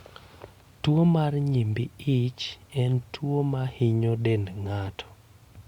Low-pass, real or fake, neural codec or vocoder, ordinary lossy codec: 19.8 kHz; real; none; Opus, 64 kbps